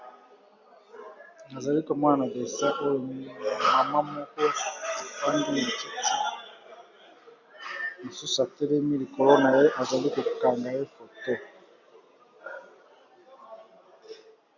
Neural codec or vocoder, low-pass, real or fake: none; 7.2 kHz; real